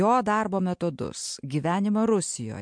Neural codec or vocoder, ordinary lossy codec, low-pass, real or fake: none; MP3, 64 kbps; 9.9 kHz; real